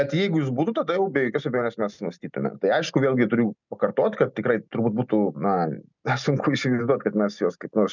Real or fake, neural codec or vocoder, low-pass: real; none; 7.2 kHz